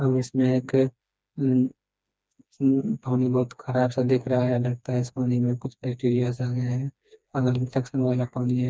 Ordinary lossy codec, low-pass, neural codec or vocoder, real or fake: none; none; codec, 16 kHz, 2 kbps, FreqCodec, smaller model; fake